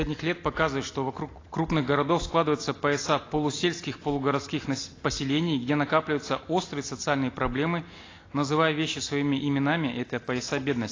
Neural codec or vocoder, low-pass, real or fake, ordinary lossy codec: none; 7.2 kHz; real; AAC, 32 kbps